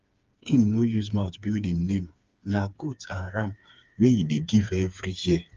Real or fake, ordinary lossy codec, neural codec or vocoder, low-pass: fake; Opus, 32 kbps; codec, 16 kHz, 4 kbps, FreqCodec, smaller model; 7.2 kHz